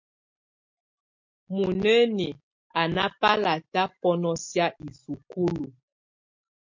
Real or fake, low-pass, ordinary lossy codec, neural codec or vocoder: real; 7.2 kHz; MP3, 48 kbps; none